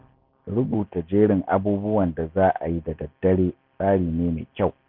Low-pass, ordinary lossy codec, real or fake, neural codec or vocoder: 5.4 kHz; none; real; none